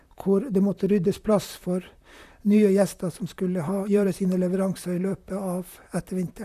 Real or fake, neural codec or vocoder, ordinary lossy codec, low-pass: fake; vocoder, 44.1 kHz, 128 mel bands, Pupu-Vocoder; none; 14.4 kHz